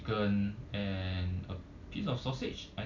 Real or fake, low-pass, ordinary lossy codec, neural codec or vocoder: real; 7.2 kHz; MP3, 64 kbps; none